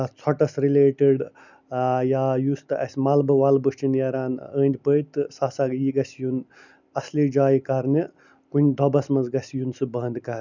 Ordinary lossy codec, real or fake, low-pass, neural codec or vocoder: none; real; 7.2 kHz; none